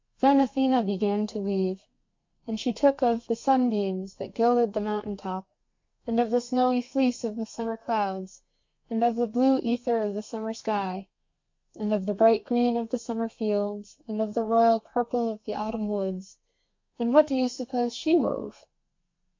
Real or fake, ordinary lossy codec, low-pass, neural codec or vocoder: fake; MP3, 48 kbps; 7.2 kHz; codec, 32 kHz, 1.9 kbps, SNAC